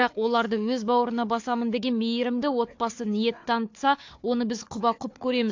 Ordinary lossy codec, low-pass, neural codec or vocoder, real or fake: AAC, 48 kbps; 7.2 kHz; codec, 16 kHz, 4 kbps, FunCodec, trained on Chinese and English, 50 frames a second; fake